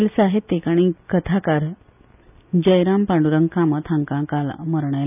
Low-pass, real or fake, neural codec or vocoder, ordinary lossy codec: 3.6 kHz; real; none; none